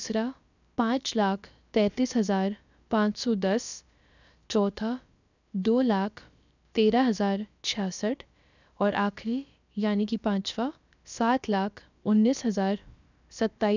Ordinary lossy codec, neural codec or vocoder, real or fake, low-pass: none; codec, 16 kHz, about 1 kbps, DyCAST, with the encoder's durations; fake; 7.2 kHz